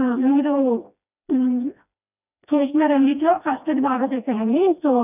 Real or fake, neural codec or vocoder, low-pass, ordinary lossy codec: fake; codec, 16 kHz, 1 kbps, FreqCodec, smaller model; 3.6 kHz; none